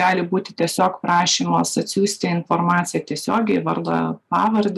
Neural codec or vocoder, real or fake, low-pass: none; real; 14.4 kHz